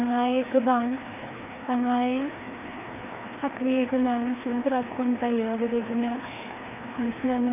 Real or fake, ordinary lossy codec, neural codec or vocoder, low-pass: fake; none; codec, 16 kHz, 2 kbps, FreqCodec, larger model; 3.6 kHz